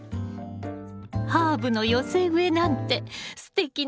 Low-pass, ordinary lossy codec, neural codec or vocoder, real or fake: none; none; none; real